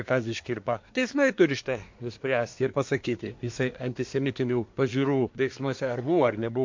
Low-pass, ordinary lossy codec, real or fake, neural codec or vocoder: 7.2 kHz; MP3, 48 kbps; fake; codec, 24 kHz, 1 kbps, SNAC